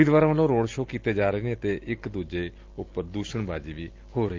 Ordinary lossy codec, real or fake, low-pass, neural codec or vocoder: Opus, 32 kbps; real; 7.2 kHz; none